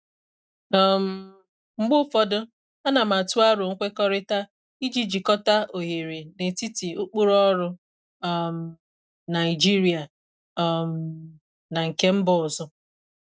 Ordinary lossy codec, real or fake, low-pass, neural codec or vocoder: none; real; none; none